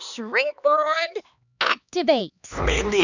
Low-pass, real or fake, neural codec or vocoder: 7.2 kHz; fake; codec, 16 kHz, 2 kbps, X-Codec, HuBERT features, trained on LibriSpeech